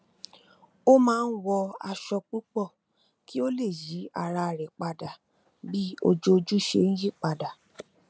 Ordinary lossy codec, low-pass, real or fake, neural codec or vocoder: none; none; real; none